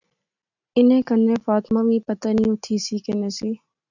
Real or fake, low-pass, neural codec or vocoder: real; 7.2 kHz; none